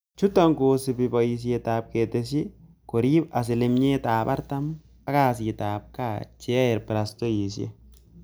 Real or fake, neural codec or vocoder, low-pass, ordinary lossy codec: real; none; none; none